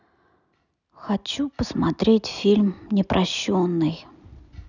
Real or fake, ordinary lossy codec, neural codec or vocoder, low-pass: real; none; none; 7.2 kHz